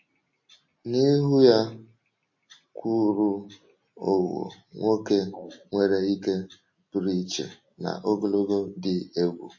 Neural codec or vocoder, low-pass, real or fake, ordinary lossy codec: none; 7.2 kHz; real; MP3, 32 kbps